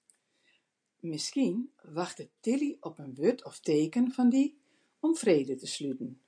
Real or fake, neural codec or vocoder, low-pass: real; none; 9.9 kHz